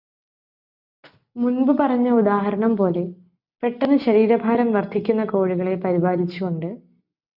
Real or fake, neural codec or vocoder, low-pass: real; none; 5.4 kHz